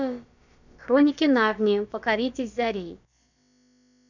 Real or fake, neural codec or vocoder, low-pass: fake; codec, 16 kHz, about 1 kbps, DyCAST, with the encoder's durations; 7.2 kHz